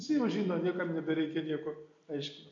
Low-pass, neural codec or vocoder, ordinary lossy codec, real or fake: 7.2 kHz; none; MP3, 48 kbps; real